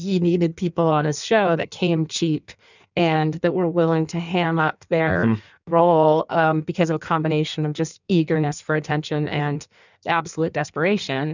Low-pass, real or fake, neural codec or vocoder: 7.2 kHz; fake; codec, 16 kHz in and 24 kHz out, 1.1 kbps, FireRedTTS-2 codec